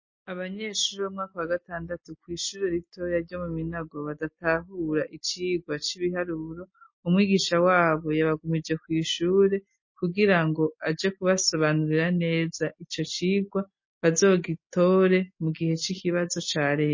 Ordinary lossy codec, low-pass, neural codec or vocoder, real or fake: MP3, 32 kbps; 7.2 kHz; none; real